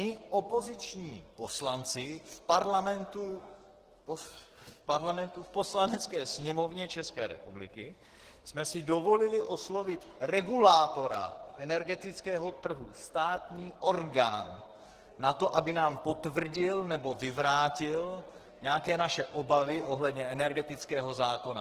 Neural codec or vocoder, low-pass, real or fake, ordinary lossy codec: codec, 44.1 kHz, 2.6 kbps, SNAC; 14.4 kHz; fake; Opus, 16 kbps